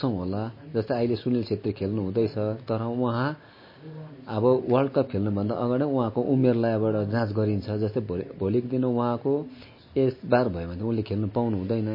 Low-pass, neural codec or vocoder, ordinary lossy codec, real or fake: 5.4 kHz; none; MP3, 24 kbps; real